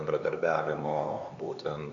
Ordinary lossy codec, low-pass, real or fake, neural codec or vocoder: Opus, 64 kbps; 7.2 kHz; fake; codec, 16 kHz, 4 kbps, X-Codec, HuBERT features, trained on LibriSpeech